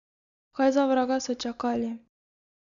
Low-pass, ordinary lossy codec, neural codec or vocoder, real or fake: 7.2 kHz; none; none; real